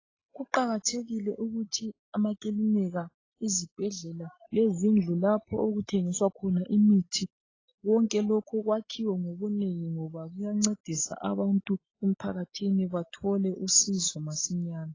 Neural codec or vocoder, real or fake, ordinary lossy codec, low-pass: none; real; AAC, 32 kbps; 7.2 kHz